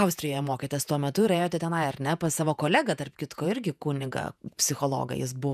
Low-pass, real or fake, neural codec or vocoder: 14.4 kHz; fake; vocoder, 48 kHz, 128 mel bands, Vocos